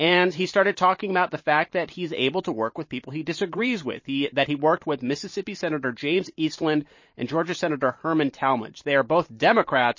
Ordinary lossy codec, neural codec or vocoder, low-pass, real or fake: MP3, 32 kbps; vocoder, 44.1 kHz, 128 mel bands every 512 samples, BigVGAN v2; 7.2 kHz; fake